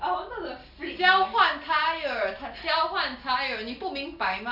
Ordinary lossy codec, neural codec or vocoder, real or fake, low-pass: none; none; real; 5.4 kHz